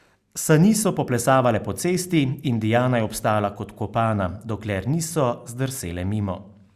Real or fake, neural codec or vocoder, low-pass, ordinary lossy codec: real; none; 14.4 kHz; Opus, 64 kbps